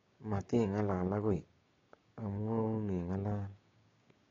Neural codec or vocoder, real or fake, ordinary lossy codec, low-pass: codec, 16 kHz, 6 kbps, DAC; fake; AAC, 32 kbps; 7.2 kHz